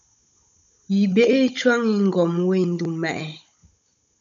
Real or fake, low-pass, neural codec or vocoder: fake; 7.2 kHz; codec, 16 kHz, 16 kbps, FunCodec, trained on Chinese and English, 50 frames a second